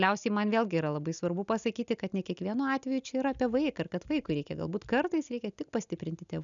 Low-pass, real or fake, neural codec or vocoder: 7.2 kHz; real; none